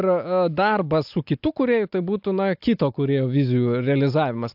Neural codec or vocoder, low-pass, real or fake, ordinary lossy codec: none; 5.4 kHz; real; AAC, 48 kbps